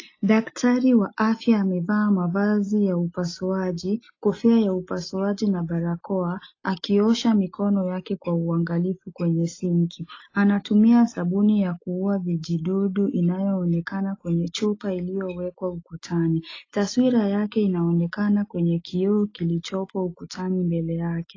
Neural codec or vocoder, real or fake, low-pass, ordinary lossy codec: none; real; 7.2 kHz; AAC, 32 kbps